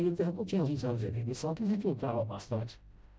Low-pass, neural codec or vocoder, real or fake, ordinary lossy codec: none; codec, 16 kHz, 0.5 kbps, FreqCodec, smaller model; fake; none